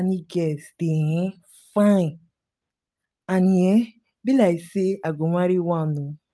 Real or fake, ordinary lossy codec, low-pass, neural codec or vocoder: real; none; none; none